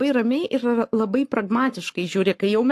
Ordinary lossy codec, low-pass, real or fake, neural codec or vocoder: AAC, 64 kbps; 14.4 kHz; fake; codec, 44.1 kHz, 7.8 kbps, Pupu-Codec